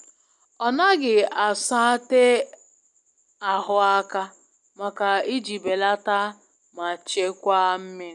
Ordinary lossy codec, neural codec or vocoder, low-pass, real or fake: none; none; 10.8 kHz; real